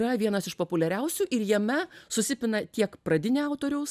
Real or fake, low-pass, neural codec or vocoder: real; 14.4 kHz; none